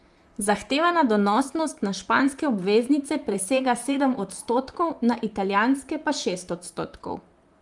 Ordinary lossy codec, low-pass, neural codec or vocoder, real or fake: Opus, 32 kbps; 10.8 kHz; vocoder, 44.1 kHz, 128 mel bands, Pupu-Vocoder; fake